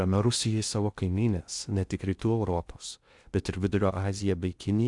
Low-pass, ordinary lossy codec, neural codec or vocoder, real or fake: 10.8 kHz; Opus, 64 kbps; codec, 16 kHz in and 24 kHz out, 0.6 kbps, FocalCodec, streaming, 2048 codes; fake